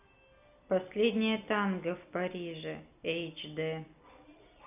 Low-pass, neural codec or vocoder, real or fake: 3.6 kHz; none; real